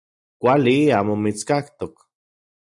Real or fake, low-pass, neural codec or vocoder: real; 10.8 kHz; none